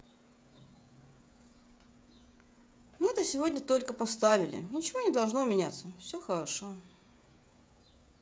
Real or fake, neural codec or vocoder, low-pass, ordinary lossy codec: fake; codec, 16 kHz, 16 kbps, FreqCodec, smaller model; none; none